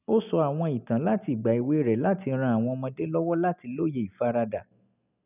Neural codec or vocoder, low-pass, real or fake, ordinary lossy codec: none; 3.6 kHz; real; none